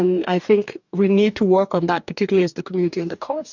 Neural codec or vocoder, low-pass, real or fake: codec, 44.1 kHz, 2.6 kbps, DAC; 7.2 kHz; fake